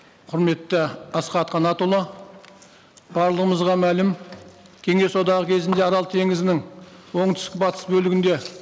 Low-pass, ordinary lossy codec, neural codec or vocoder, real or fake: none; none; none; real